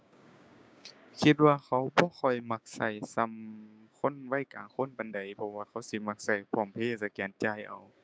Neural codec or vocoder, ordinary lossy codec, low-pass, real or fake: codec, 16 kHz, 6 kbps, DAC; none; none; fake